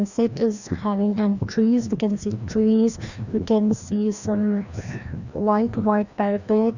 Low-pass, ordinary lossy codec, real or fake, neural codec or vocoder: 7.2 kHz; none; fake; codec, 16 kHz, 1 kbps, FreqCodec, larger model